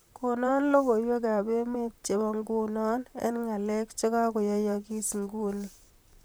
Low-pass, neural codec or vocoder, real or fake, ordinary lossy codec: none; vocoder, 44.1 kHz, 128 mel bands, Pupu-Vocoder; fake; none